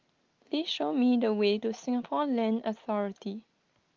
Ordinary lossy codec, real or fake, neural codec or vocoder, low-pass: Opus, 32 kbps; real; none; 7.2 kHz